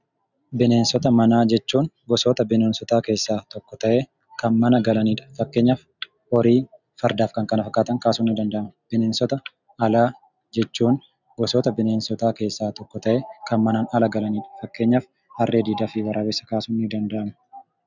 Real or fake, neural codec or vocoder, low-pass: real; none; 7.2 kHz